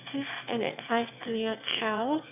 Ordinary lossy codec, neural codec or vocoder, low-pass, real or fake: none; autoencoder, 22.05 kHz, a latent of 192 numbers a frame, VITS, trained on one speaker; 3.6 kHz; fake